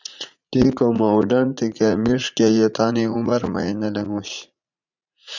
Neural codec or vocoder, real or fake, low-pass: vocoder, 44.1 kHz, 80 mel bands, Vocos; fake; 7.2 kHz